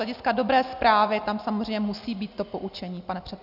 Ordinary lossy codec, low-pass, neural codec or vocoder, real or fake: AAC, 48 kbps; 5.4 kHz; none; real